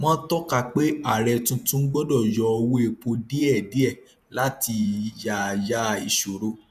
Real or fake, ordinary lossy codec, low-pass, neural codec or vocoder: fake; none; 14.4 kHz; vocoder, 48 kHz, 128 mel bands, Vocos